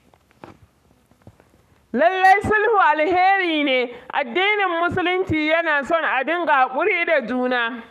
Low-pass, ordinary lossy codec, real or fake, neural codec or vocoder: 14.4 kHz; none; fake; codec, 44.1 kHz, 7.8 kbps, Pupu-Codec